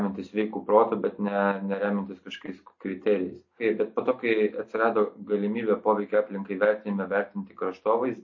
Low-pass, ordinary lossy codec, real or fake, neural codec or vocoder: 7.2 kHz; MP3, 32 kbps; real; none